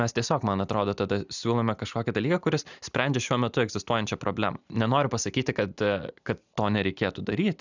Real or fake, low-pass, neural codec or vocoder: real; 7.2 kHz; none